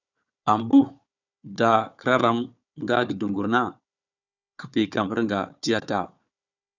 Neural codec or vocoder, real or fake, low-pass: codec, 16 kHz, 4 kbps, FunCodec, trained on Chinese and English, 50 frames a second; fake; 7.2 kHz